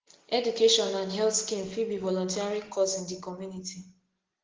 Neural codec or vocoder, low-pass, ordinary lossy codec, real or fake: none; 7.2 kHz; Opus, 16 kbps; real